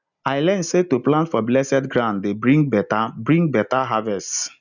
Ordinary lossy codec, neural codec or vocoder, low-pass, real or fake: none; none; 7.2 kHz; real